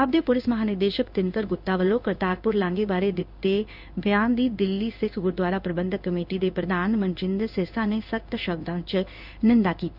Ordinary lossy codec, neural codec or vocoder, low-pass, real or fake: none; codec, 16 kHz in and 24 kHz out, 1 kbps, XY-Tokenizer; 5.4 kHz; fake